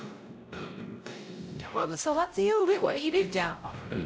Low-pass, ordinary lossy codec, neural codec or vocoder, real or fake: none; none; codec, 16 kHz, 0.5 kbps, X-Codec, WavLM features, trained on Multilingual LibriSpeech; fake